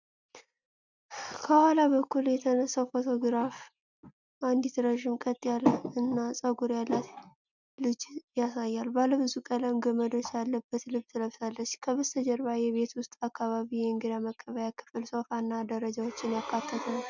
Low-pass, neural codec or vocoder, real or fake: 7.2 kHz; none; real